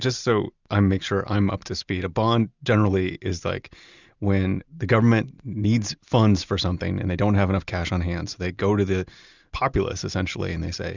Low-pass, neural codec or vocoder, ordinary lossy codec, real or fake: 7.2 kHz; none; Opus, 64 kbps; real